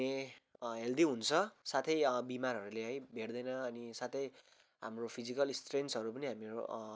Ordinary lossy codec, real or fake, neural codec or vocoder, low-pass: none; real; none; none